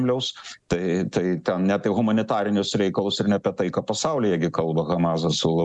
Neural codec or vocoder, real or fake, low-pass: none; real; 10.8 kHz